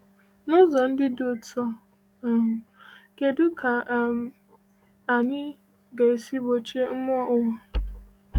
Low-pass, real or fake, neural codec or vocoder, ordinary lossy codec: 19.8 kHz; fake; codec, 44.1 kHz, 7.8 kbps, DAC; none